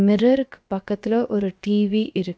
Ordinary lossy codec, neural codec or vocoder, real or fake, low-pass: none; codec, 16 kHz, about 1 kbps, DyCAST, with the encoder's durations; fake; none